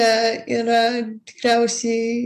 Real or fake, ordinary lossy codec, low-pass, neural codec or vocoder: real; AAC, 96 kbps; 14.4 kHz; none